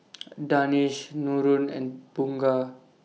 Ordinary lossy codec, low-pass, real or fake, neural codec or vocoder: none; none; real; none